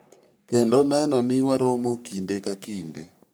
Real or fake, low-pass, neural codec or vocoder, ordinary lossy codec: fake; none; codec, 44.1 kHz, 3.4 kbps, Pupu-Codec; none